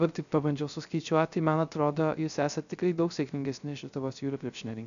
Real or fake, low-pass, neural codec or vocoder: fake; 7.2 kHz; codec, 16 kHz, 0.3 kbps, FocalCodec